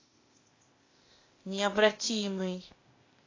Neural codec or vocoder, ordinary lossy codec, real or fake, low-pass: codec, 16 kHz, 0.8 kbps, ZipCodec; AAC, 32 kbps; fake; 7.2 kHz